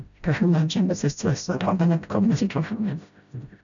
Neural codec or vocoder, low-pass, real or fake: codec, 16 kHz, 0.5 kbps, FreqCodec, smaller model; 7.2 kHz; fake